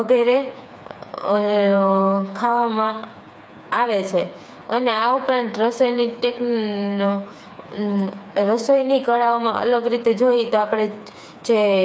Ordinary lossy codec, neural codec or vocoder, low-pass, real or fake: none; codec, 16 kHz, 4 kbps, FreqCodec, smaller model; none; fake